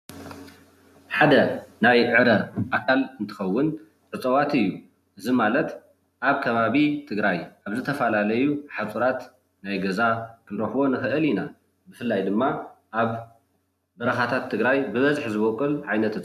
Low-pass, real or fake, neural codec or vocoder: 14.4 kHz; real; none